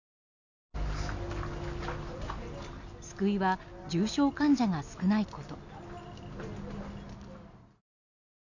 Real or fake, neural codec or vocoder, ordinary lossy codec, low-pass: real; none; none; 7.2 kHz